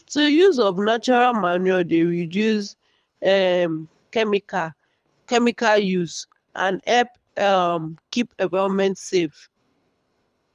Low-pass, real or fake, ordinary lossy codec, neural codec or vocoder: none; fake; none; codec, 24 kHz, 3 kbps, HILCodec